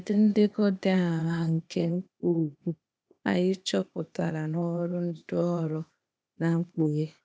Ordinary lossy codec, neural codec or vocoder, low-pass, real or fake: none; codec, 16 kHz, 0.8 kbps, ZipCodec; none; fake